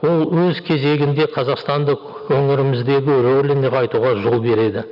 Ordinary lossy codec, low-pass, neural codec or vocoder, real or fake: none; 5.4 kHz; none; real